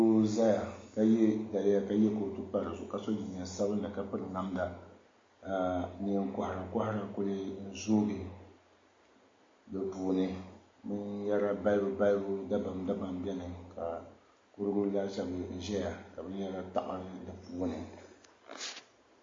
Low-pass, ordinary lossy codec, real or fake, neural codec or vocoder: 7.2 kHz; MP3, 32 kbps; real; none